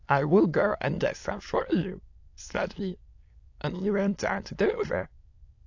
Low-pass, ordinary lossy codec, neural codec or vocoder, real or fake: 7.2 kHz; AAC, 48 kbps; autoencoder, 22.05 kHz, a latent of 192 numbers a frame, VITS, trained on many speakers; fake